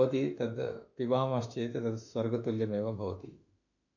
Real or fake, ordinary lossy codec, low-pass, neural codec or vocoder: fake; none; 7.2 kHz; autoencoder, 48 kHz, 32 numbers a frame, DAC-VAE, trained on Japanese speech